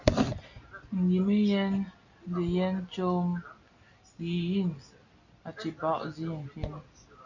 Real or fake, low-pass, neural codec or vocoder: real; 7.2 kHz; none